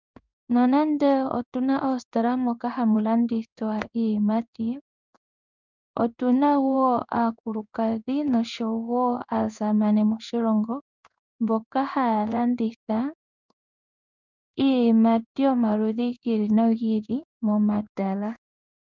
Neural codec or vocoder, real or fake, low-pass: codec, 16 kHz in and 24 kHz out, 1 kbps, XY-Tokenizer; fake; 7.2 kHz